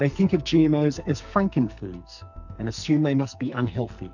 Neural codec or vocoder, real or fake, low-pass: codec, 44.1 kHz, 2.6 kbps, SNAC; fake; 7.2 kHz